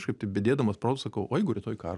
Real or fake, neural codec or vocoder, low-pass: real; none; 10.8 kHz